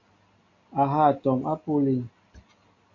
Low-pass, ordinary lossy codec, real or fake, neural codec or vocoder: 7.2 kHz; MP3, 48 kbps; real; none